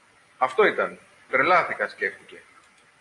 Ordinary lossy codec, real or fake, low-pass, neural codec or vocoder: AAC, 48 kbps; real; 10.8 kHz; none